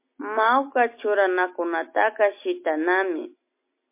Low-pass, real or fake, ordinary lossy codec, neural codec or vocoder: 3.6 kHz; real; MP3, 24 kbps; none